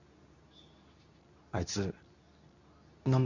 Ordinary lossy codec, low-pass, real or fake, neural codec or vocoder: none; 7.2 kHz; fake; codec, 24 kHz, 0.9 kbps, WavTokenizer, medium speech release version 2